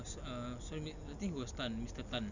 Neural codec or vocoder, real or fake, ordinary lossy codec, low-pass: none; real; none; 7.2 kHz